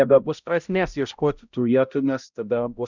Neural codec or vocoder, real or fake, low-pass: codec, 16 kHz, 0.5 kbps, X-Codec, HuBERT features, trained on balanced general audio; fake; 7.2 kHz